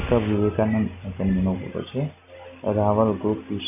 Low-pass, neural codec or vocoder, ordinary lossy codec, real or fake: 3.6 kHz; none; none; real